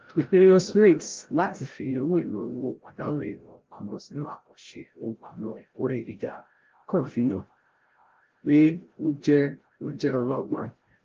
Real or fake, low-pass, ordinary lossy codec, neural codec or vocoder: fake; 7.2 kHz; Opus, 16 kbps; codec, 16 kHz, 0.5 kbps, FreqCodec, larger model